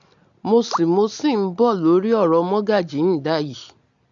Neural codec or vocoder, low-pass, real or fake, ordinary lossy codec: none; 7.2 kHz; real; AAC, 64 kbps